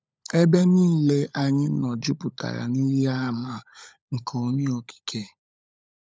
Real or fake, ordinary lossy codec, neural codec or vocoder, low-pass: fake; none; codec, 16 kHz, 16 kbps, FunCodec, trained on LibriTTS, 50 frames a second; none